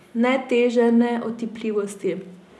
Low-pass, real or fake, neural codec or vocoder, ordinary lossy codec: none; real; none; none